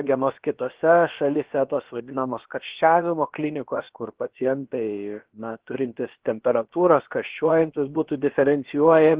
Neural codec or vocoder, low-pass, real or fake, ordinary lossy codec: codec, 16 kHz, about 1 kbps, DyCAST, with the encoder's durations; 3.6 kHz; fake; Opus, 16 kbps